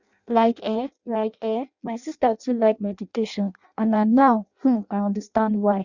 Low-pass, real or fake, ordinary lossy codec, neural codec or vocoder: 7.2 kHz; fake; Opus, 64 kbps; codec, 16 kHz in and 24 kHz out, 0.6 kbps, FireRedTTS-2 codec